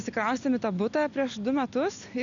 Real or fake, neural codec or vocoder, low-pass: real; none; 7.2 kHz